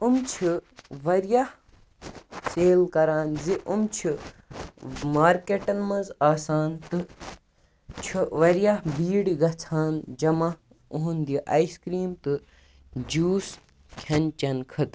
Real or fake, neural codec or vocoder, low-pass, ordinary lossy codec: real; none; none; none